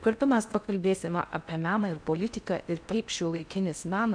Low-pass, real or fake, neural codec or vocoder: 9.9 kHz; fake; codec, 16 kHz in and 24 kHz out, 0.6 kbps, FocalCodec, streaming, 2048 codes